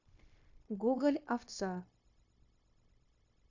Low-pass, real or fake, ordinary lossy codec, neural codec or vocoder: 7.2 kHz; fake; AAC, 48 kbps; codec, 16 kHz, 0.9 kbps, LongCat-Audio-Codec